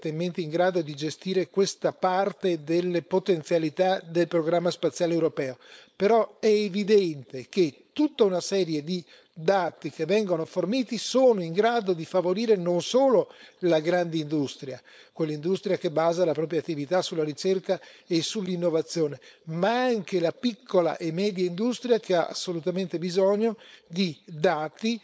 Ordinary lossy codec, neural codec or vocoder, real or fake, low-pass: none; codec, 16 kHz, 4.8 kbps, FACodec; fake; none